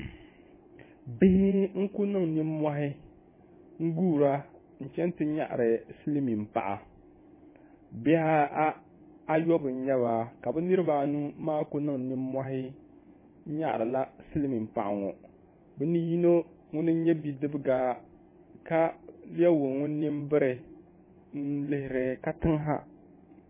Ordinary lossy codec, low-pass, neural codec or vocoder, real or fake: MP3, 16 kbps; 3.6 kHz; vocoder, 22.05 kHz, 80 mel bands, WaveNeXt; fake